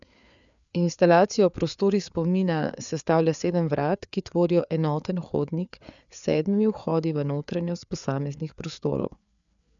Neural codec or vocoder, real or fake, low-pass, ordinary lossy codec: codec, 16 kHz, 4 kbps, FreqCodec, larger model; fake; 7.2 kHz; none